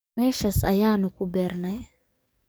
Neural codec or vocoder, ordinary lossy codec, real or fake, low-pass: codec, 44.1 kHz, 7.8 kbps, DAC; none; fake; none